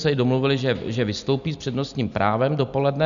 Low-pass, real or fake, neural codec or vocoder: 7.2 kHz; real; none